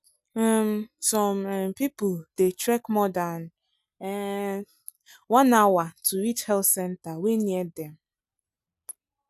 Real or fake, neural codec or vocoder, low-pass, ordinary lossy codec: real; none; 14.4 kHz; none